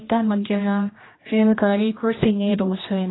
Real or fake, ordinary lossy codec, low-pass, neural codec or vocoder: fake; AAC, 16 kbps; 7.2 kHz; codec, 16 kHz, 1 kbps, X-Codec, HuBERT features, trained on general audio